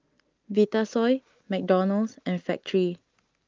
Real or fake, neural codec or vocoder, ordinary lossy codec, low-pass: real; none; Opus, 32 kbps; 7.2 kHz